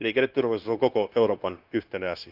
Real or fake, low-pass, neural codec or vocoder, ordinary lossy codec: fake; 5.4 kHz; codec, 16 kHz, 0.9 kbps, LongCat-Audio-Codec; Opus, 24 kbps